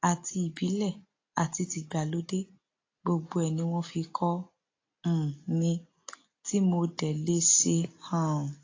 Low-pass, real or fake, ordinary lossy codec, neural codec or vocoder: 7.2 kHz; real; AAC, 32 kbps; none